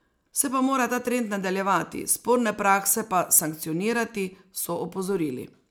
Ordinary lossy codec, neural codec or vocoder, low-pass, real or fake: none; none; none; real